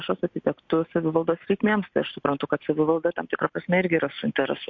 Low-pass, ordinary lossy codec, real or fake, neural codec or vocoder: 7.2 kHz; MP3, 48 kbps; real; none